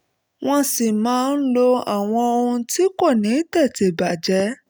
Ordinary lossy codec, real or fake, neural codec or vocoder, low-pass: none; real; none; none